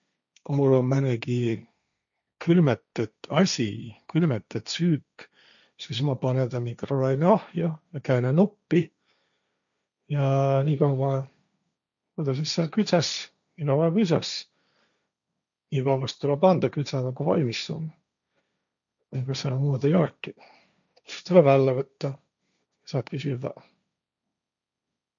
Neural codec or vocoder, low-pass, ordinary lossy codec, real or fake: codec, 16 kHz, 1.1 kbps, Voila-Tokenizer; 7.2 kHz; none; fake